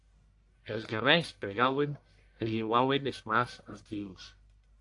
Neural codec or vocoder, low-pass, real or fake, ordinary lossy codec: codec, 44.1 kHz, 1.7 kbps, Pupu-Codec; 10.8 kHz; fake; AAC, 64 kbps